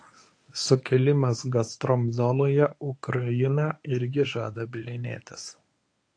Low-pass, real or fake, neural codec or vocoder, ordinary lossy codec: 9.9 kHz; fake; codec, 24 kHz, 0.9 kbps, WavTokenizer, medium speech release version 1; AAC, 48 kbps